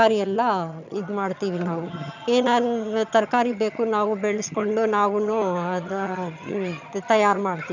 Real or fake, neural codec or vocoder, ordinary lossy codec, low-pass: fake; vocoder, 22.05 kHz, 80 mel bands, HiFi-GAN; none; 7.2 kHz